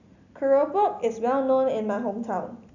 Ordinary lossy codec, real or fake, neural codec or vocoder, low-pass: none; real; none; 7.2 kHz